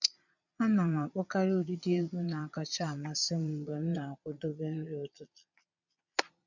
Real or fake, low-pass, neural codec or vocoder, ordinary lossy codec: fake; 7.2 kHz; vocoder, 22.05 kHz, 80 mel bands, Vocos; none